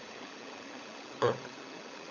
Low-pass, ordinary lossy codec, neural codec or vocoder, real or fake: 7.2 kHz; none; codec, 16 kHz, 16 kbps, FunCodec, trained on LibriTTS, 50 frames a second; fake